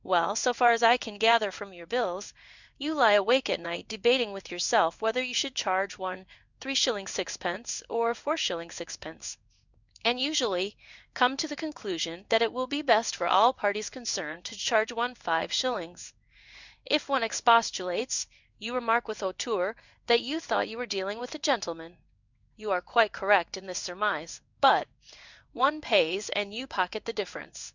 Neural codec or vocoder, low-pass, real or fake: codec, 16 kHz in and 24 kHz out, 1 kbps, XY-Tokenizer; 7.2 kHz; fake